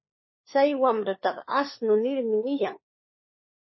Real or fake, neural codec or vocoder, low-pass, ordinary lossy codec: fake; codec, 16 kHz, 4 kbps, FunCodec, trained on LibriTTS, 50 frames a second; 7.2 kHz; MP3, 24 kbps